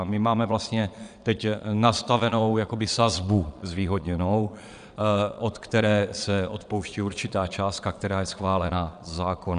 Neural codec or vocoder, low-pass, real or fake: vocoder, 22.05 kHz, 80 mel bands, Vocos; 9.9 kHz; fake